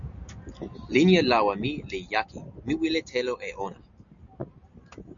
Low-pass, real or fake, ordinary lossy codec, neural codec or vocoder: 7.2 kHz; real; MP3, 96 kbps; none